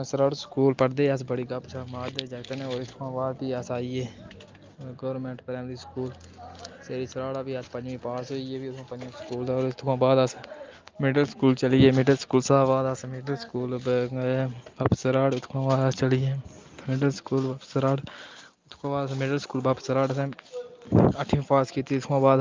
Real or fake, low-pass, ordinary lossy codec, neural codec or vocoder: real; 7.2 kHz; Opus, 24 kbps; none